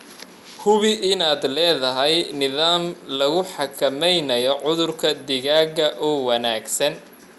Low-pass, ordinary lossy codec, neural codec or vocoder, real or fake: 14.4 kHz; Opus, 64 kbps; none; real